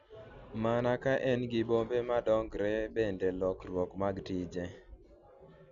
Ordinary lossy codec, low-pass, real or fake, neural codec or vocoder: none; 7.2 kHz; real; none